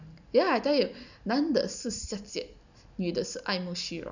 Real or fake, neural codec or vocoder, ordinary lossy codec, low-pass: real; none; none; 7.2 kHz